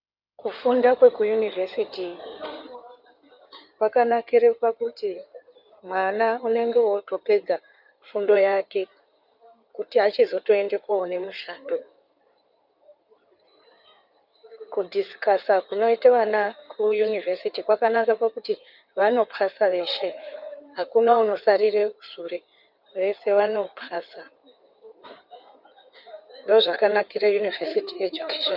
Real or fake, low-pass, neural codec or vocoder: fake; 5.4 kHz; codec, 16 kHz in and 24 kHz out, 2.2 kbps, FireRedTTS-2 codec